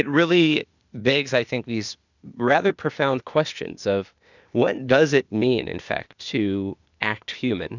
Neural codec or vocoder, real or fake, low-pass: codec, 16 kHz, 0.8 kbps, ZipCodec; fake; 7.2 kHz